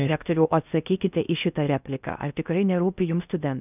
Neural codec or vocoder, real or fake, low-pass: codec, 16 kHz in and 24 kHz out, 0.6 kbps, FocalCodec, streaming, 4096 codes; fake; 3.6 kHz